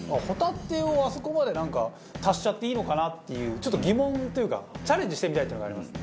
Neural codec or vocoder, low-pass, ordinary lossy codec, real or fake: none; none; none; real